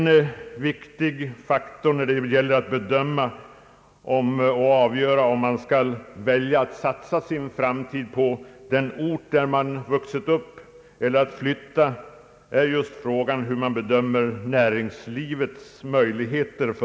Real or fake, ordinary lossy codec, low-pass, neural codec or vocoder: real; none; none; none